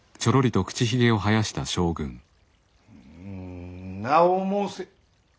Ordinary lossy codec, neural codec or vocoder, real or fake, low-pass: none; none; real; none